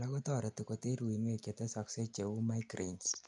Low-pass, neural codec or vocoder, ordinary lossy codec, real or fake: 10.8 kHz; none; none; real